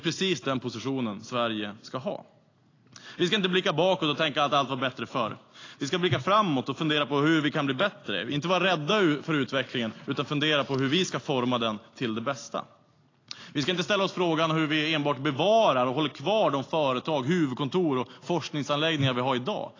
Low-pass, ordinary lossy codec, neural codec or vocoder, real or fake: 7.2 kHz; AAC, 32 kbps; none; real